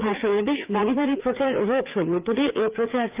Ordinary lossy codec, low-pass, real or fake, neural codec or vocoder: Opus, 24 kbps; 3.6 kHz; fake; codec, 16 kHz, 4 kbps, FreqCodec, larger model